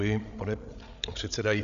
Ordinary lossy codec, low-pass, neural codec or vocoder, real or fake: MP3, 96 kbps; 7.2 kHz; codec, 16 kHz, 16 kbps, FreqCodec, larger model; fake